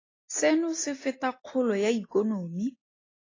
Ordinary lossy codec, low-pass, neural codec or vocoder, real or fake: AAC, 32 kbps; 7.2 kHz; none; real